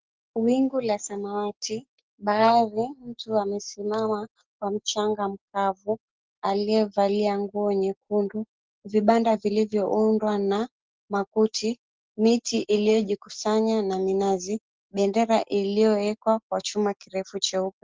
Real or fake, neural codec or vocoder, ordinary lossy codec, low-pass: real; none; Opus, 16 kbps; 7.2 kHz